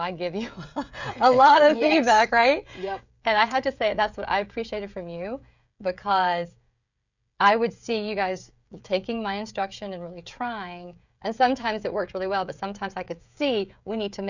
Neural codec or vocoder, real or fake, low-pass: codec, 16 kHz, 16 kbps, FreqCodec, smaller model; fake; 7.2 kHz